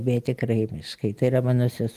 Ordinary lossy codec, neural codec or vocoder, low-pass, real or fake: Opus, 32 kbps; none; 14.4 kHz; real